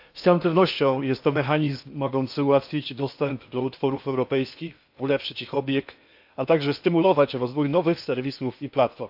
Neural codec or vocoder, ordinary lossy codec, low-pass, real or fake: codec, 16 kHz in and 24 kHz out, 0.8 kbps, FocalCodec, streaming, 65536 codes; AAC, 48 kbps; 5.4 kHz; fake